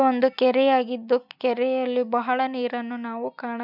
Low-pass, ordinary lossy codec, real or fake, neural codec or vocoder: 5.4 kHz; none; real; none